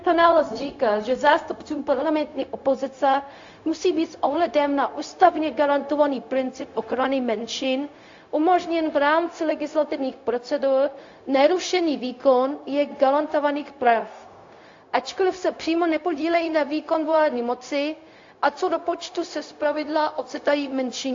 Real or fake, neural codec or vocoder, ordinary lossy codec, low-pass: fake; codec, 16 kHz, 0.4 kbps, LongCat-Audio-Codec; AAC, 48 kbps; 7.2 kHz